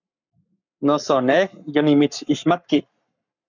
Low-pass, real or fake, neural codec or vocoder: 7.2 kHz; fake; codec, 44.1 kHz, 7.8 kbps, Pupu-Codec